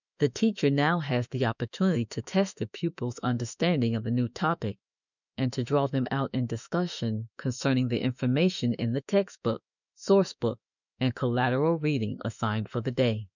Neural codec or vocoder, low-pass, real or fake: autoencoder, 48 kHz, 32 numbers a frame, DAC-VAE, trained on Japanese speech; 7.2 kHz; fake